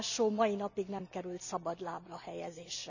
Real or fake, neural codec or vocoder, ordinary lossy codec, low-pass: real; none; none; 7.2 kHz